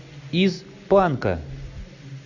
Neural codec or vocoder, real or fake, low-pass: none; real; 7.2 kHz